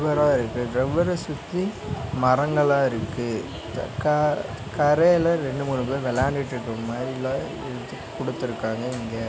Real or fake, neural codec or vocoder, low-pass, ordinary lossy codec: real; none; none; none